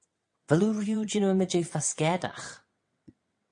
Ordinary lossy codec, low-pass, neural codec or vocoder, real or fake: MP3, 48 kbps; 9.9 kHz; vocoder, 22.05 kHz, 80 mel bands, WaveNeXt; fake